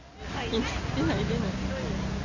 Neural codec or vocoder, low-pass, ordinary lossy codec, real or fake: none; 7.2 kHz; none; real